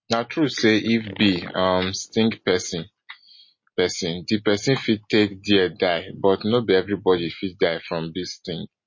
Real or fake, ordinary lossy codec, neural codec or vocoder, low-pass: real; MP3, 32 kbps; none; 7.2 kHz